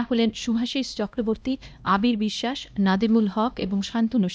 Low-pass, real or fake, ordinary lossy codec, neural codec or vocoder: none; fake; none; codec, 16 kHz, 1 kbps, X-Codec, HuBERT features, trained on LibriSpeech